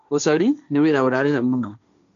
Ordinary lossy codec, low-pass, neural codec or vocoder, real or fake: none; 7.2 kHz; codec, 16 kHz, 1.1 kbps, Voila-Tokenizer; fake